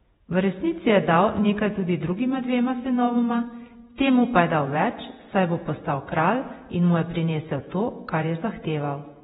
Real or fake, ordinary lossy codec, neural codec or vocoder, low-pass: fake; AAC, 16 kbps; vocoder, 48 kHz, 128 mel bands, Vocos; 19.8 kHz